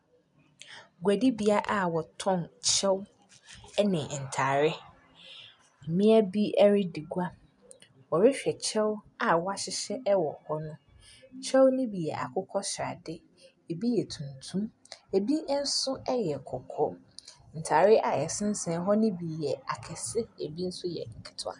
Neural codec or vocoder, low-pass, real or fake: none; 10.8 kHz; real